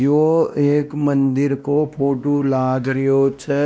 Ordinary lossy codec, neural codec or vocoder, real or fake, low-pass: none; codec, 16 kHz, 1 kbps, X-Codec, WavLM features, trained on Multilingual LibriSpeech; fake; none